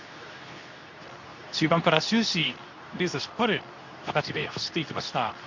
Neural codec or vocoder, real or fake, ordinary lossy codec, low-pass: codec, 24 kHz, 0.9 kbps, WavTokenizer, medium speech release version 2; fake; none; 7.2 kHz